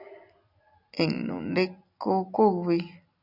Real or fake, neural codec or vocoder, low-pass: real; none; 5.4 kHz